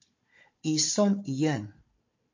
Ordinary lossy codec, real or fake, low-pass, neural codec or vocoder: MP3, 48 kbps; fake; 7.2 kHz; codec, 16 kHz, 4 kbps, FunCodec, trained on Chinese and English, 50 frames a second